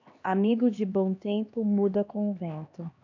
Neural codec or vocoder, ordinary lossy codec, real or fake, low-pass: codec, 16 kHz, 2 kbps, X-Codec, HuBERT features, trained on LibriSpeech; AAC, 48 kbps; fake; 7.2 kHz